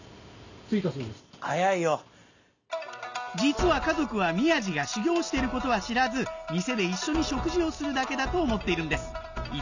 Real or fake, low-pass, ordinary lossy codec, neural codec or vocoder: real; 7.2 kHz; none; none